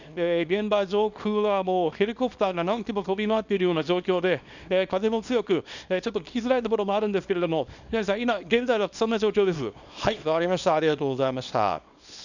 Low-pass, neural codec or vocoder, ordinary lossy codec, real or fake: 7.2 kHz; codec, 24 kHz, 0.9 kbps, WavTokenizer, small release; none; fake